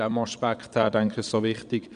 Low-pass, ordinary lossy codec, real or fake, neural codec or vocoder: 9.9 kHz; AAC, 96 kbps; fake; vocoder, 22.05 kHz, 80 mel bands, Vocos